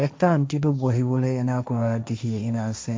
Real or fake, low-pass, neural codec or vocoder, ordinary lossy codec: fake; none; codec, 16 kHz, 1.1 kbps, Voila-Tokenizer; none